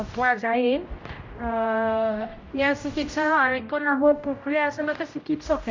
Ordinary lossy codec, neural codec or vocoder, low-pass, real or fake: MP3, 48 kbps; codec, 16 kHz, 0.5 kbps, X-Codec, HuBERT features, trained on general audio; 7.2 kHz; fake